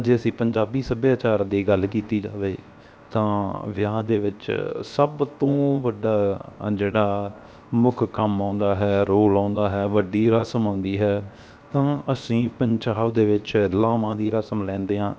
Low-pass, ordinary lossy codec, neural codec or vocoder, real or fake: none; none; codec, 16 kHz, 0.7 kbps, FocalCodec; fake